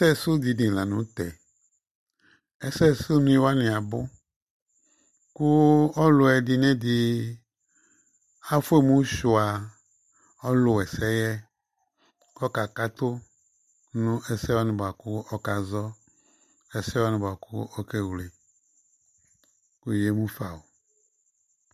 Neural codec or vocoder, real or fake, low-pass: none; real; 14.4 kHz